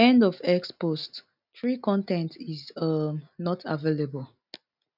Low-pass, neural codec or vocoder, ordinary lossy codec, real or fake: 5.4 kHz; none; none; real